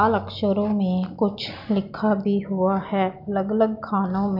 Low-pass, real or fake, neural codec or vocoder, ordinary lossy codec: 5.4 kHz; real; none; none